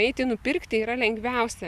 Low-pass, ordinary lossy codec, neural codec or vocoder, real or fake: 14.4 kHz; Opus, 64 kbps; none; real